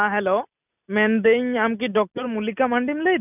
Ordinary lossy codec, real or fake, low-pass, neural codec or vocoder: none; real; 3.6 kHz; none